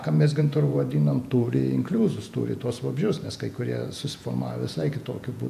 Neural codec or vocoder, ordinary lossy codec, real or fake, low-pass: vocoder, 48 kHz, 128 mel bands, Vocos; AAC, 96 kbps; fake; 14.4 kHz